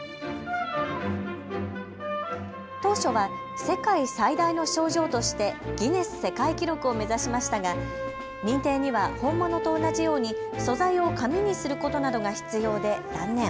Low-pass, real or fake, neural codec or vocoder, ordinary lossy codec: none; real; none; none